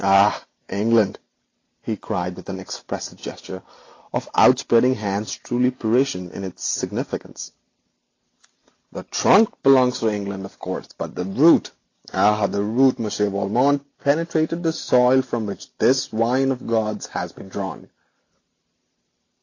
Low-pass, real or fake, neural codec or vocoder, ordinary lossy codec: 7.2 kHz; real; none; AAC, 32 kbps